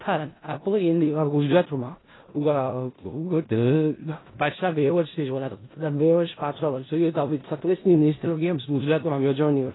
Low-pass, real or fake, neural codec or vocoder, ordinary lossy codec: 7.2 kHz; fake; codec, 16 kHz in and 24 kHz out, 0.4 kbps, LongCat-Audio-Codec, four codebook decoder; AAC, 16 kbps